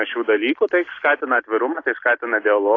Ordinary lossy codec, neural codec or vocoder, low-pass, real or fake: AAC, 32 kbps; none; 7.2 kHz; real